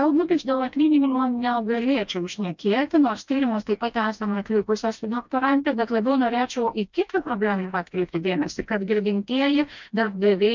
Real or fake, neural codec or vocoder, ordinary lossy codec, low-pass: fake; codec, 16 kHz, 1 kbps, FreqCodec, smaller model; MP3, 64 kbps; 7.2 kHz